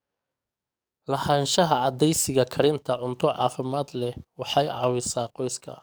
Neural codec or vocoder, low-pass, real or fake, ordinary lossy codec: codec, 44.1 kHz, 7.8 kbps, DAC; none; fake; none